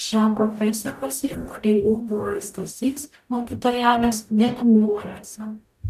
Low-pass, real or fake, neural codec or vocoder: 14.4 kHz; fake; codec, 44.1 kHz, 0.9 kbps, DAC